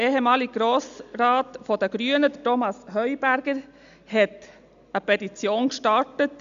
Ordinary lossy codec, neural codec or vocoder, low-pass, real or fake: none; none; 7.2 kHz; real